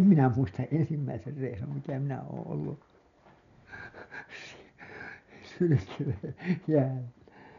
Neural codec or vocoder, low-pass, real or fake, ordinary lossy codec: none; 7.2 kHz; real; none